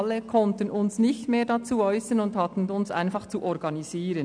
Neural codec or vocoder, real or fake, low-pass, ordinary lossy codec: none; real; 9.9 kHz; none